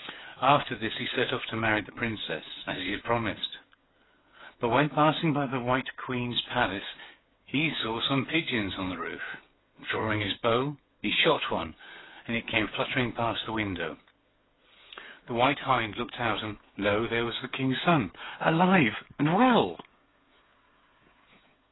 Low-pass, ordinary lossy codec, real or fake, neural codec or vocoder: 7.2 kHz; AAC, 16 kbps; fake; codec, 16 kHz, 8 kbps, FreqCodec, smaller model